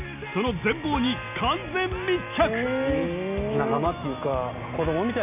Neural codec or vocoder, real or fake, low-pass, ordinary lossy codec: none; real; 3.6 kHz; none